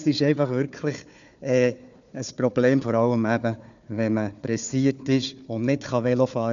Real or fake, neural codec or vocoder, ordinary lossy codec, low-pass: fake; codec, 16 kHz, 4 kbps, FunCodec, trained on Chinese and English, 50 frames a second; none; 7.2 kHz